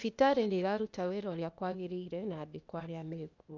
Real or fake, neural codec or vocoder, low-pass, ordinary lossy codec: fake; codec, 16 kHz, 0.8 kbps, ZipCodec; 7.2 kHz; none